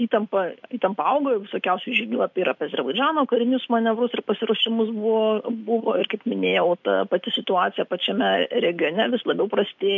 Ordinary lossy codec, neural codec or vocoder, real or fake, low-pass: MP3, 64 kbps; none; real; 7.2 kHz